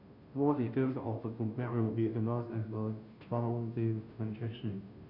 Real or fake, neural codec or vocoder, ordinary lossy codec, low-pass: fake; codec, 16 kHz, 0.5 kbps, FunCodec, trained on Chinese and English, 25 frames a second; none; 5.4 kHz